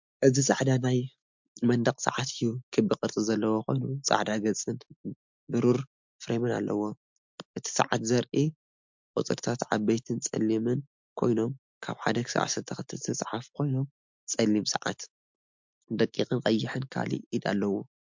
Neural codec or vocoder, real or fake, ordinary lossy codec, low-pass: none; real; MP3, 64 kbps; 7.2 kHz